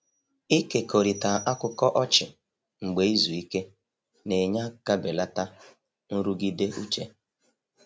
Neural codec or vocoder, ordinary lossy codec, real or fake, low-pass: none; none; real; none